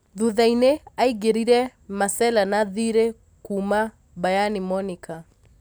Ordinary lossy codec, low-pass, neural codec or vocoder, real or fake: none; none; none; real